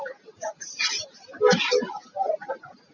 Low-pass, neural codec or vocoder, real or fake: 7.2 kHz; none; real